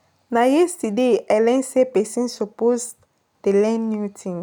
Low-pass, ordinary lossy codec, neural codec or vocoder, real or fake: none; none; none; real